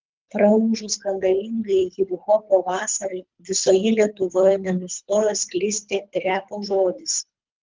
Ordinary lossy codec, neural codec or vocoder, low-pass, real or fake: Opus, 32 kbps; codec, 24 kHz, 3 kbps, HILCodec; 7.2 kHz; fake